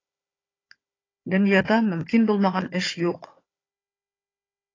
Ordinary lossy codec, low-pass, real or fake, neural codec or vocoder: AAC, 32 kbps; 7.2 kHz; fake; codec, 16 kHz, 4 kbps, FunCodec, trained on Chinese and English, 50 frames a second